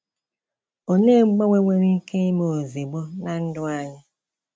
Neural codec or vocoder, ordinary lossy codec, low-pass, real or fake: none; none; none; real